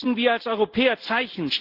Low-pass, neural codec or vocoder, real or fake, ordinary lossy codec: 5.4 kHz; none; real; Opus, 16 kbps